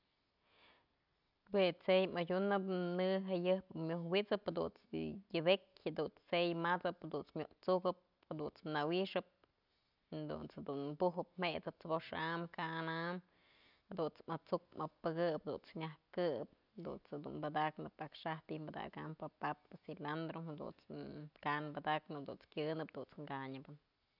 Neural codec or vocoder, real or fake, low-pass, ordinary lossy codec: none; real; 5.4 kHz; none